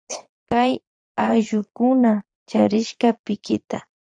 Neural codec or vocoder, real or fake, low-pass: vocoder, 22.05 kHz, 80 mel bands, Vocos; fake; 9.9 kHz